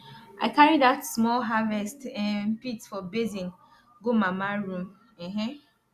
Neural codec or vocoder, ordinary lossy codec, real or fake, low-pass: none; Opus, 64 kbps; real; 14.4 kHz